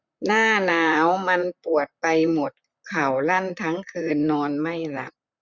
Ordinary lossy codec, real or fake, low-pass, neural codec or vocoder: none; fake; 7.2 kHz; vocoder, 44.1 kHz, 128 mel bands, Pupu-Vocoder